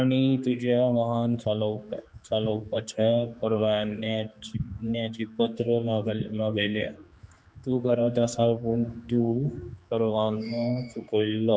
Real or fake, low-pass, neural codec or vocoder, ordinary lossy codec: fake; none; codec, 16 kHz, 2 kbps, X-Codec, HuBERT features, trained on general audio; none